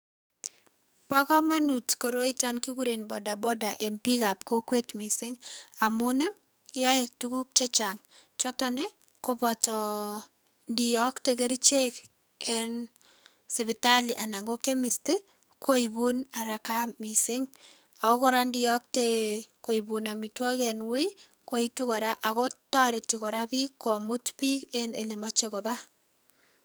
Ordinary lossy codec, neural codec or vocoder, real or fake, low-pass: none; codec, 44.1 kHz, 2.6 kbps, SNAC; fake; none